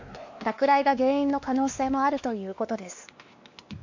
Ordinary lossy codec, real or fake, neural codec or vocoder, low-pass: MP3, 48 kbps; fake; codec, 16 kHz, 2 kbps, X-Codec, WavLM features, trained on Multilingual LibriSpeech; 7.2 kHz